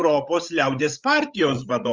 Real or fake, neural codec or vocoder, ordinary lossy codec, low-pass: fake; codec, 16 kHz, 16 kbps, FreqCodec, larger model; Opus, 32 kbps; 7.2 kHz